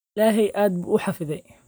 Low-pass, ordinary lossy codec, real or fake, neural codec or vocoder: none; none; real; none